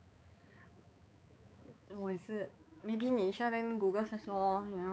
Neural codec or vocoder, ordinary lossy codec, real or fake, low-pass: codec, 16 kHz, 4 kbps, X-Codec, HuBERT features, trained on balanced general audio; none; fake; none